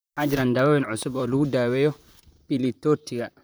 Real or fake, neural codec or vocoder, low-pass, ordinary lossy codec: fake; vocoder, 44.1 kHz, 128 mel bands, Pupu-Vocoder; none; none